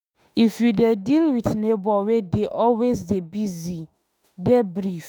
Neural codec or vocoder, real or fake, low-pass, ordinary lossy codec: autoencoder, 48 kHz, 32 numbers a frame, DAC-VAE, trained on Japanese speech; fake; none; none